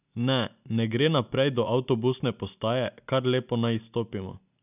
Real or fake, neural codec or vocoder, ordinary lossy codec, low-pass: real; none; none; 3.6 kHz